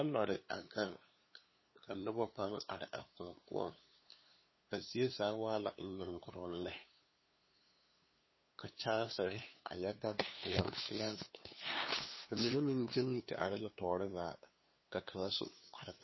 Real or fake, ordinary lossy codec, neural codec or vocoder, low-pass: fake; MP3, 24 kbps; codec, 16 kHz, 2 kbps, FunCodec, trained on LibriTTS, 25 frames a second; 7.2 kHz